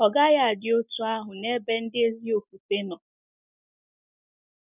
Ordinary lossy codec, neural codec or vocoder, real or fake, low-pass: none; none; real; 3.6 kHz